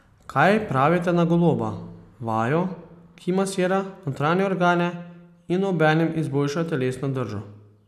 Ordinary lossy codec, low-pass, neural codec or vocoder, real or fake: none; 14.4 kHz; none; real